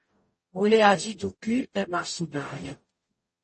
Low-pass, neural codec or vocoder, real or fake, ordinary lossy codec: 10.8 kHz; codec, 44.1 kHz, 0.9 kbps, DAC; fake; MP3, 32 kbps